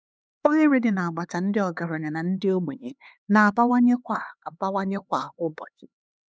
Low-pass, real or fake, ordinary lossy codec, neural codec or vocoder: none; fake; none; codec, 16 kHz, 4 kbps, X-Codec, HuBERT features, trained on LibriSpeech